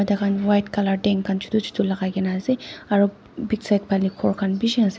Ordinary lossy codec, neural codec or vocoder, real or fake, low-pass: none; none; real; none